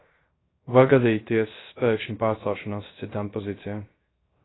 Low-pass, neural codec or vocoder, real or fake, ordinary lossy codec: 7.2 kHz; codec, 16 kHz, 0.3 kbps, FocalCodec; fake; AAC, 16 kbps